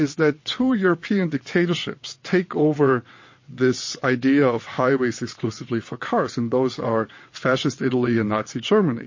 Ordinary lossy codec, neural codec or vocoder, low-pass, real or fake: MP3, 32 kbps; vocoder, 22.05 kHz, 80 mel bands, WaveNeXt; 7.2 kHz; fake